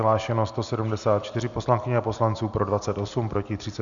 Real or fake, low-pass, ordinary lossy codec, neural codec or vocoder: real; 7.2 kHz; MP3, 96 kbps; none